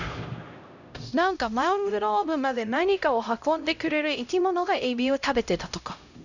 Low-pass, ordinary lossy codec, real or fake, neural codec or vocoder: 7.2 kHz; none; fake; codec, 16 kHz, 0.5 kbps, X-Codec, HuBERT features, trained on LibriSpeech